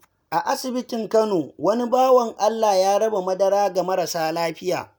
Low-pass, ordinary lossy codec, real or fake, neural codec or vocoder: none; none; real; none